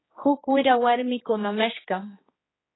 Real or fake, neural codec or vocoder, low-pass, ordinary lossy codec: fake; codec, 16 kHz, 2 kbps, X-Codec, HuBERT features, trained on general audio; 7.2 kHz; AAC, 16 kbps